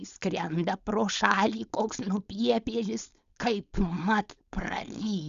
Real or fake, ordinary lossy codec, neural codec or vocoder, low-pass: fake; Opus, 64 kbps; codec, 16 kHz, 4.8 kbps, FACodec; 7.2 kHz